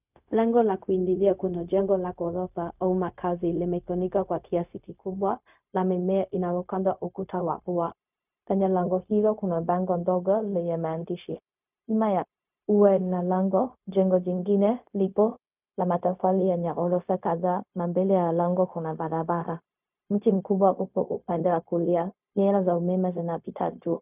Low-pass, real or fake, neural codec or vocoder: 3.6 kHz; fake; codec, 16 kHz, 0.4 kbps, LongCat-Audio-Codec